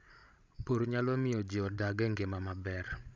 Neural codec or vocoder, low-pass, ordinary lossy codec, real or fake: codec, 16 kHz, 16 kbps, FreqCodec, larger model; none; none; fake